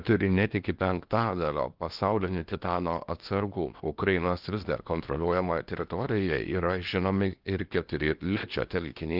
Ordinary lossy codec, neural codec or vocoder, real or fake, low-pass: Opus, 32 kbps; codec, 16 kHz in and 24 kHz out, 0.8 kbps, FocalCodec, streaming, 65536 codes; fake; 5.4 kHz